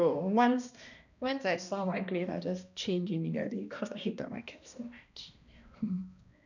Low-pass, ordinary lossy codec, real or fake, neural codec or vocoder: 7.2 kHz; none; fake; codec, 16 kHz, 1 kbps, X-Codec, HuBERT features, trained on balanced general audio